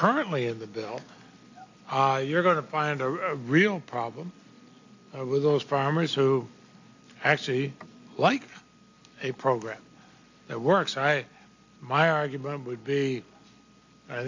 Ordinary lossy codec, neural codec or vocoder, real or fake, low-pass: AAC, 48 kbps; none; real; 7.2 kHz